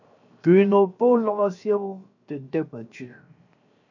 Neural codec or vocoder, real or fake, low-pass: codec, 16 kHz, 0.7 kbps, FocalCodec; fake; 7.2 kHz